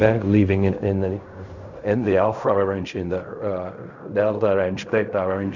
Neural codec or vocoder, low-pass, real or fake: codec, 16 kHz in and 24 kHz out, 0.4 kbps, LongCat-Audio-Codec, fine tuned four codebook decoder; 7.2 kHz; fake